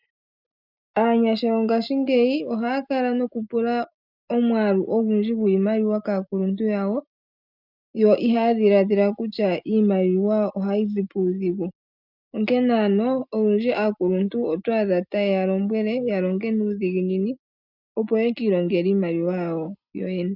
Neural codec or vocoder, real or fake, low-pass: none; real; 5.4 kHz